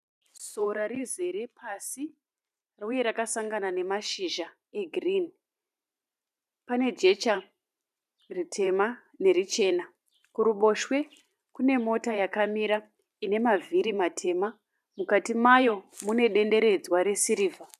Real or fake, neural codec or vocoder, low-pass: fake; vocoder, 44.1 kHz, 128 mel bands every 512 samples, BigVGAN v2; 14.4 kHz